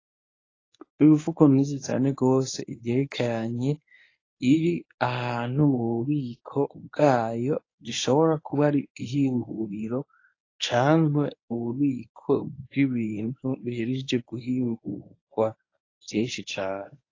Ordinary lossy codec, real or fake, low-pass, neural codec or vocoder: AAC, 32 kbps; fake; 7.2 kHz; codec, 24 kHz, 0.9 kbps, WavTokenizer, medium speech release version 1